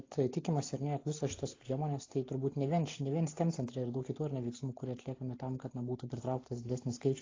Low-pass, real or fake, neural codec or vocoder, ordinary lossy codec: 7.2 kHz; real; none; AAC, 32 kbps